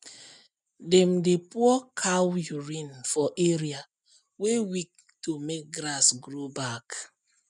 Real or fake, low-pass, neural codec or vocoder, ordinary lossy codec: real; 10.8 kHz; none; none